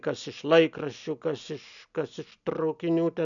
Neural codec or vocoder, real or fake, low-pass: none; real; 7.2 kHz